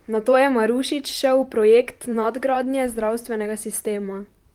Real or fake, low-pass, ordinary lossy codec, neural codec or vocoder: fake; 19.8 kHz; Opus, 32 kbps; vocoder, 44.1 kHz, 128 mel bands, Pupu-Vocoder